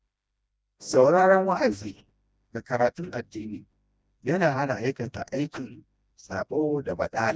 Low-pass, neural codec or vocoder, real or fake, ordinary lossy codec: none; codec, 16 kHz, 1 kbps, FreqCodec, smaller model; fake; none